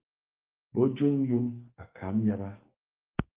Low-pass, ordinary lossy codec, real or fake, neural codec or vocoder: 3.6 kHz; Opus, 24 kbps; fake; codec, 44.1 kHz, 2.6 kbps, SNAC